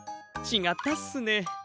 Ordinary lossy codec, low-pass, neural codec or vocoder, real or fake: none; none; none; real